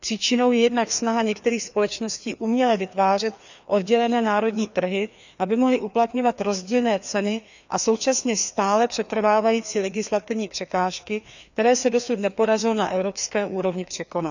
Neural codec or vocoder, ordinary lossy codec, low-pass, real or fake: codec, 16 kHz, 2 kbps, FreqCodec, larger model; none; 7.2 kHz; fake